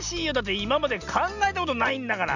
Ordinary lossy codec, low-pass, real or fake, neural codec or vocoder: none; 7.2 kHz; real; none